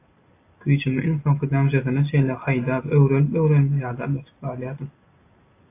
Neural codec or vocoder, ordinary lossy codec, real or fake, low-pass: none; AAC, 24 kbps; real; 3.6 kHz